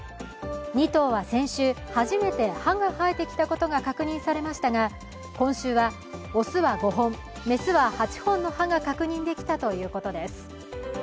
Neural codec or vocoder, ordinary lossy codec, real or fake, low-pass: none; none; real; none